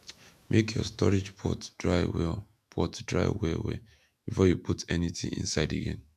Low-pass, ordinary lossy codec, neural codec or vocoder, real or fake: 14.4 kHz; none; autoencoder, 48 kHz, 128 numbers a frame, DAC-VAE, trained on Japanese speech; fake